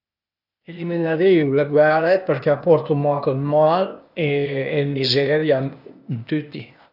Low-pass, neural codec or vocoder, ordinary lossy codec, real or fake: 5.4 kHz; codec, 16 kHz, 0.8 kbps, ZipCodec; none; fake